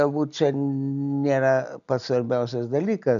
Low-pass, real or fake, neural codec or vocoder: 7.2 kHz; real; none